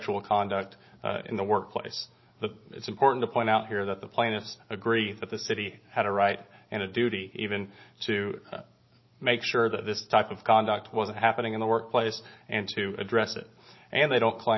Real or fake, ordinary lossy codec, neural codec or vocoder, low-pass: real; MP3, 24 kbps; none; 7.2 kHz